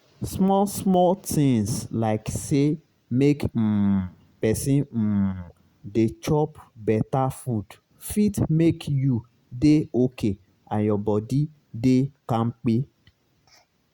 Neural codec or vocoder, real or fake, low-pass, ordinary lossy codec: none; real; 19.8 kHz; Opus, 64 kbps